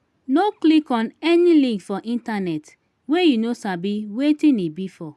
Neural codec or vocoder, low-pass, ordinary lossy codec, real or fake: none; none; none; real